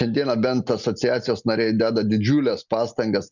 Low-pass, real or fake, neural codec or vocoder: 7.2 kHz; real; none